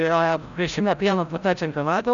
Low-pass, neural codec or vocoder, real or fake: 7.2 kHz; codec, 16 kHz, 0.5 kbps, FreqCodec, larger model; fake